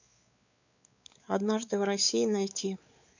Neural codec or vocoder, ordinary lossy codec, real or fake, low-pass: codec, 16 kHz, 4 kbps, X-Codec, WavLM features, trained on Multilingual LibriSpeech; none; fake; 7.2 kHz